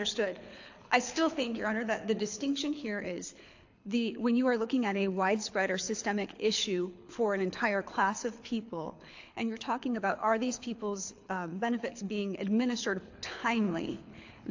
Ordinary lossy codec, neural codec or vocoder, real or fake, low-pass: AAC, 48 kbps; codec, 24 kHz, 6 kbps, HILCodec; fake; 7.2 kHz